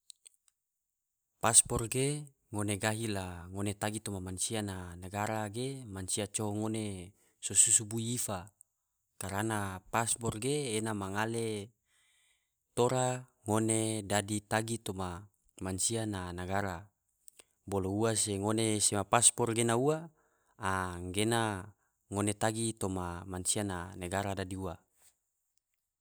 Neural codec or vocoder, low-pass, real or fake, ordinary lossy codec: none; none; real; none